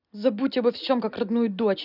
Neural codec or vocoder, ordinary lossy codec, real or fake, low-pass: none; none; real; 5.4 kHz